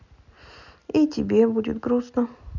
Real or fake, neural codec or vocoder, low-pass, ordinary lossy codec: real; none; 7.2 kHz; none